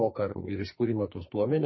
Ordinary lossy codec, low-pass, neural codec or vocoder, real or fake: MP3, 24 kbps; 7.2 kHz; codec, 44.1 kHz, 2.6 kbps, SNAC; fake